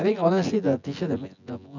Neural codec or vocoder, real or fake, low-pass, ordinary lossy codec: vocoder, 24 kHz, 100 mel bands, Vocos; fake; 7.2 kHz; none